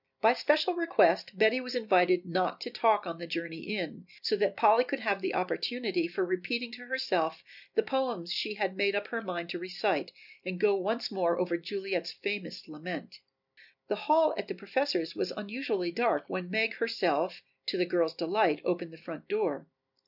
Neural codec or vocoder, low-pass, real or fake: none; 5.4 kHz; real